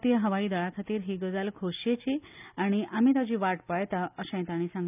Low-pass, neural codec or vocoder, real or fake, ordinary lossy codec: 3.6 kHz; none; real; none